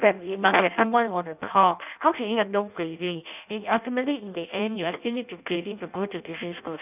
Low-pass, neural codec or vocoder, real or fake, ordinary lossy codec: 3.6 kHz; codec, 16 kHz in and 24 kHz out, 0.6 kbps, FireRedTTS-2 codec; fake; none